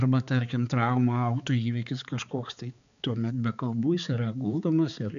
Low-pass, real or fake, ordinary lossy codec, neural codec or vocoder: 7.2 kHz; fake; AAC, 96 kbps; codec, 16 kHz, 4 kbps, X-Codec, HuBERT features, trained on balanced general audio